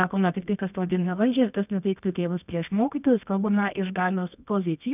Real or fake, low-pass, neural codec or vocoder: fake; 3.6 kHz; codec, 24 kHz, 0.9 kbps, WavTokenizer, medium music audio release